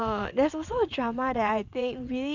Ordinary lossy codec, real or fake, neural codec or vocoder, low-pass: none; fake; vocoder, 22.05 kHz, 80 mel bands, WaveNeXt; 7.2 kHz